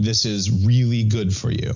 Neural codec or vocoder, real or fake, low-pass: none; real; 7.2 kHz